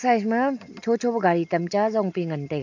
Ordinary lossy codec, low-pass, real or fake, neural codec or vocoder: none; 7.2 kHz; real; none